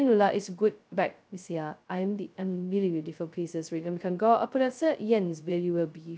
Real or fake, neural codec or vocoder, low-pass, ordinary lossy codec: fake; codec, 16 kHz, 0.2 kbps, FocalCodec; none; none